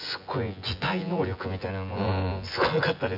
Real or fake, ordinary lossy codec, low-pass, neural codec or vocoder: fake; AAC, 48 kbps; 5.4 kHz; vocoder, 24 kHz, 100 mel bands, Vocos